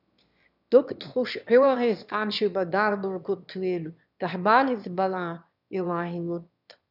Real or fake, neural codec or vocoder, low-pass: fake; autoencoder, 22.05 kHz, a latent of 192 numbers a frame, VITS, trained on one speaker; 5.4 kHz